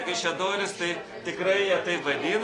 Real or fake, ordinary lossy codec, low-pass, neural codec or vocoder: real; AAC, 32 kbps; 10.8 kHz; none